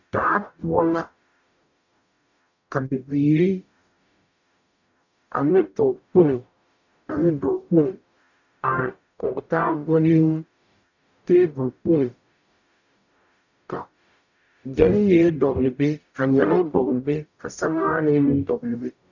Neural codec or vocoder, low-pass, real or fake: codec, 44.1 kHz, 0.9 kbps, DAC; 7.2 kHz; fake